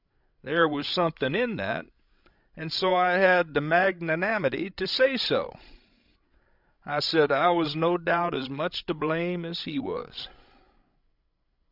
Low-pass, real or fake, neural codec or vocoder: 5.4 kHz; fake; codec, 16 kHz, 16 kbps, FreqCodec, larger model